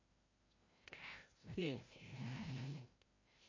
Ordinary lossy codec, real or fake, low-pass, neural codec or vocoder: MP3, 32 kbps; fake; 7.2 kHz; codec, 16 kHz, 0.5 kbps, FreqCodec, larger model